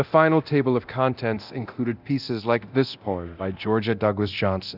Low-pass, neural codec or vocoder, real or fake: 5.4 kHz; codec, 24 kHz, 0.9 kbps, DualCodec; fake